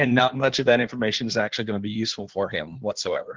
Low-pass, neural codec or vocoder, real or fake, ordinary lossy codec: 7.2 kHz; codec, 16 kHz in and 24 kHz out, 1.1 kbps, FireRedTTS-2 codec; fake; Opus, 16 kbps